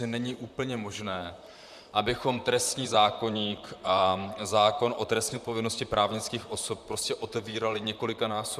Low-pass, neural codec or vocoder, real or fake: 14.4 kHz; vocoder, 44.1 kHz, 128 mel bands, Pupu-Vocoder; fake